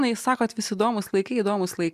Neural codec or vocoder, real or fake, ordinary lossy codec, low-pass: none; real; MP3, 96 kbps; 14.4 kHz